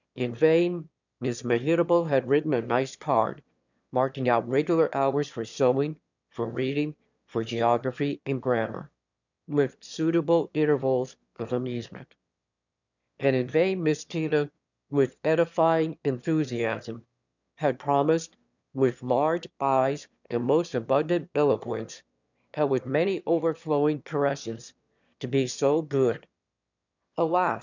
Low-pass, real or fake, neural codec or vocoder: 7.2 kHz; fake; autoencoder, 22.05 kHz, a latent of 192 numbers a frame, VITS, trained on one speaker